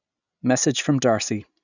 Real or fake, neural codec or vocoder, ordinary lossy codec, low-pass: real; none; none; 7.2 kHz